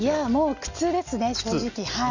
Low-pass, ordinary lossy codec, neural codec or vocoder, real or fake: 7.2 kHz; none; none; real